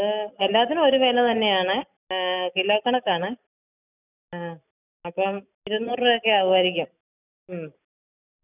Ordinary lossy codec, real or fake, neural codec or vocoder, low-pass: none; real; none; 3.6 kHz